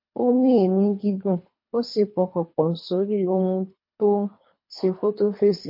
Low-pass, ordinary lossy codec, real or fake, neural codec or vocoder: 5.4 kHz; MP3, 32 kbps; fake; codec, 24 kHz, 3 kbps, HILCodec